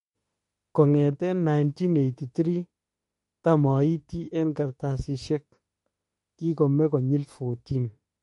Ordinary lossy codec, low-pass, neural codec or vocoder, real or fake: MP3, 48 kbps; 19.8 kHz; autoencoder, 48 kHz, 32 numbers a frame, DAC-VAE, trained on Japanese speech; fake